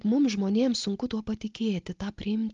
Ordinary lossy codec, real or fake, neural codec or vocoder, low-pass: Opus, 32 kbps; real; none; 7.2 kHz